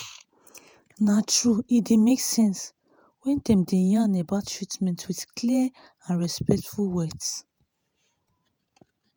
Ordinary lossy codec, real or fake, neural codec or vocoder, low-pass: none; fake; vocoder, 48 kHz, 128 mel bands, Vocos; none